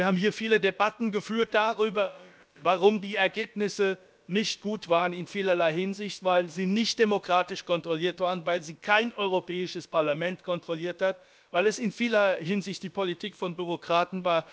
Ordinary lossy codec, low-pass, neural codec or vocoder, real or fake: none; none; codec, 16 kHz, about 1 kbps, DyCAST, with the encoder's durations; fake